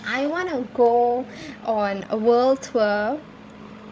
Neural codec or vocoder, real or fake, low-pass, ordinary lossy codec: codec, 16 kHz, 16 kbps, FreqCodec, larger model; fake; none; none